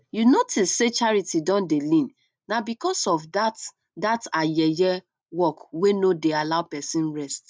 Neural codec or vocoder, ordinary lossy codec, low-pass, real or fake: none; none; none; real